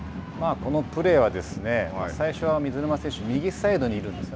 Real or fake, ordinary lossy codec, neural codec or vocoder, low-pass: real; none; none; none